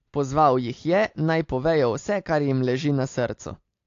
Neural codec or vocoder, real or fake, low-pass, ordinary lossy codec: none; real; 7.2 kHz; AAC, 48 kbps